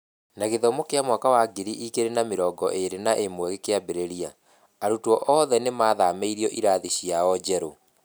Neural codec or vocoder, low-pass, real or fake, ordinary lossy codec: none; none; real; none